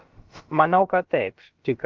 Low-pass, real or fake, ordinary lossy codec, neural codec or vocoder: 7.2 kHz; fake; Opus, 32 kbps; codec, 16 kHz, about 1 kbps, DyCAST, with the encoder's durations